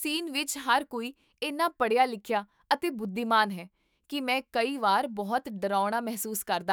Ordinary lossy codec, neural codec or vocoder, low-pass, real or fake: none; autoencoder, 48 kHz, 128 numbers a frame, DAC-VAE, trained on Japanese speech; none; fake